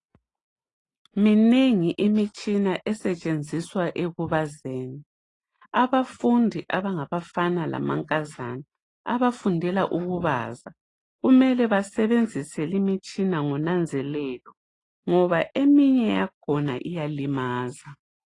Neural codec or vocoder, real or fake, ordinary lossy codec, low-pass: none; real; AAC, 32 kbps; 10.8 kHz